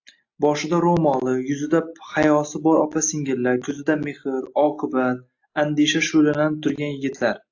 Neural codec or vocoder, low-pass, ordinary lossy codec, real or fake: none; 7.2 kHz; AAC, 48 kbps; real